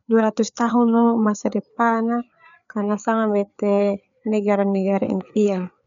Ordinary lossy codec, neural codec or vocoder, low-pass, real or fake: none; codec, 16 kHz, 4 kbps, FreqCodec, larger model; 7.2 kHz; fake